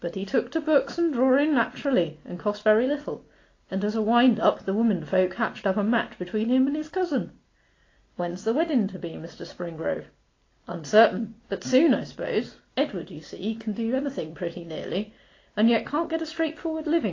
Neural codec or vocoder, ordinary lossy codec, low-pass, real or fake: none; AAC, 32 kbps; 7.2 kHz; real